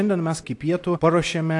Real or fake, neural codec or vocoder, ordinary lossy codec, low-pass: real; none; AAC, 64 kbps; 10.8 kHz